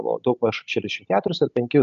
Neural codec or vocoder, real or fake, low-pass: none; real; 7.2 kHz